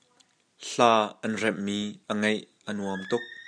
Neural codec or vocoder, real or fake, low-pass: none; real; 9.9 kHz